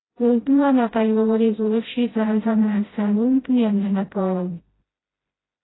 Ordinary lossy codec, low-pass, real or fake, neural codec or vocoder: AAC, 16 kbps; 7.2 kHz; fake; codec, 16 kHz, 0.5 kbps, FreqCodec, smaller model